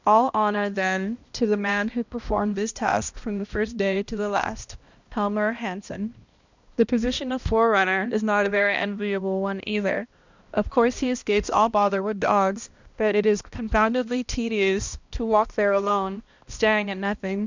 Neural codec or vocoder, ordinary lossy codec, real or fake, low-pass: codec, 16 kHz, 1 kbps, X-Codec, HuBERT features, trained on balanced general audio; Opus, 64 kbps; fake; 7.2 kHz